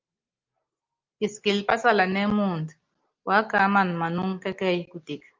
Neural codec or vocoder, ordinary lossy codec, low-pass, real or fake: none; Opus, 24 kbps; 7.2 kHz; real